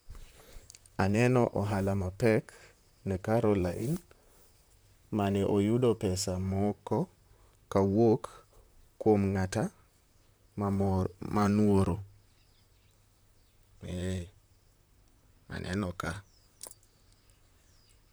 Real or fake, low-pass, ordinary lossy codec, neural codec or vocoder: fake; none; none; vocoder, 44.1 kHz, 128 mel bands, Pupu-Vocoder